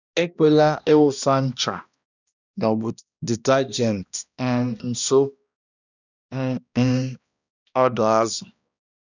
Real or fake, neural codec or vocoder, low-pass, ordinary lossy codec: fake; codec, 16 kHz, 1 kbps, X-Codec, HuBERT features, trained on balanced general audio; 7.2 kHz; none